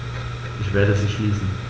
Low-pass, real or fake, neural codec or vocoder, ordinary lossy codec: none; real; none; none